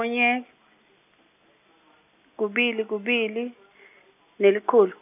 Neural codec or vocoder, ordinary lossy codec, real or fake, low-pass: none; none; real; 3.6 kHz